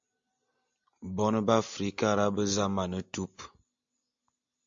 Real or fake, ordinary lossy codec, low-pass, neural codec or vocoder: real; AAC, 64 kbps; 7.2 kHz; none